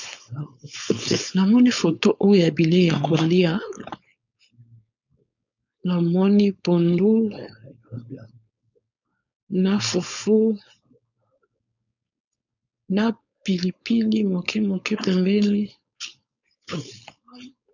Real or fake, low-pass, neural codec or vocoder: fake; 7.2 kHz; codec, 16 kHz, 4.8 kbps, FACodec